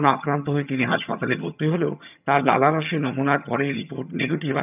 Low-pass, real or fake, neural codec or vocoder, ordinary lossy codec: 3.6 kHz; fake; vocoder, 22.05 kHz, 80 mel bands, HiFi-GAN; none